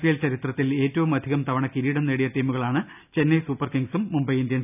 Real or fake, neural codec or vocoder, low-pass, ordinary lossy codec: real; none; 3.6 kHz; none